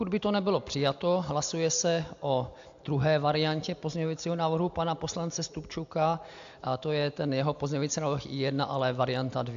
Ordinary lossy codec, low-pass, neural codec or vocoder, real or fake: AAC, 64 kbps; 7.2 kHz; none; real